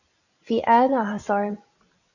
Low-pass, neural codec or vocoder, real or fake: 7.2 kHz; vocoder, 44.1 kHz, 128 mel bands every 256 samples, BigVGAN v2; fake